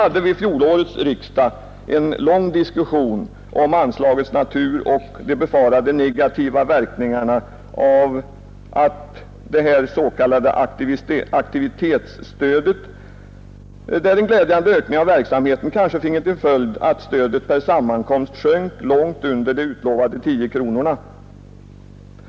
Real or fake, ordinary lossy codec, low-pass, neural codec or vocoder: real; none; none; none